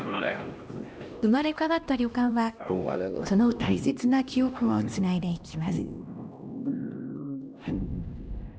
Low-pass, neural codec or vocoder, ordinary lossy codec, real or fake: none; codec, 16 kHz, 1 kbps, X-Codec, HuBERT features, trained on LibriSpeech; none; fake